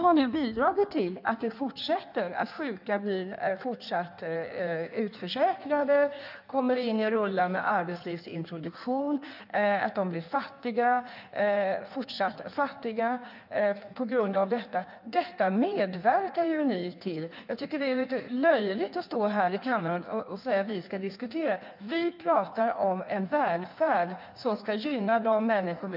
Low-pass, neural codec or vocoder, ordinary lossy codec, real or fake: 5.4 kHz; codec, 16 kHz in and 24 kHz out, 1.1 kbps, FireRedTTS-2 codec; none; fake